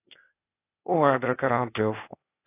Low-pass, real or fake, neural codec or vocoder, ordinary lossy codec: 3.6 kHz; fake; codec, 16 kHz, 0.8 kbps, ZipCodec; AAC, 24 kbps